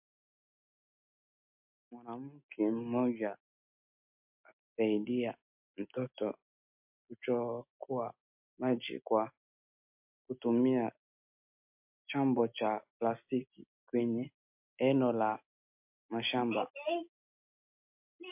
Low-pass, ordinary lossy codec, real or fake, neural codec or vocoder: 3.6 kHz; MP3, 32 kbps; real; none